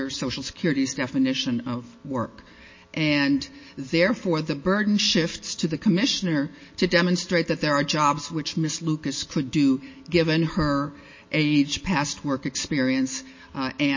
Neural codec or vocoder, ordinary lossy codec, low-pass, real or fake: none; MP3, 32 kbps; 7.2 kHz; real